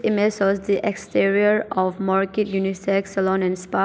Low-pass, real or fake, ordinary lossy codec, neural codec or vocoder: none; real; none; none